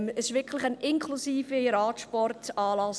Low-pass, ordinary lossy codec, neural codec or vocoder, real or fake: none; none; none; real